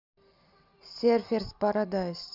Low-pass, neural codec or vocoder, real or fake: 5.4 kHz; none; real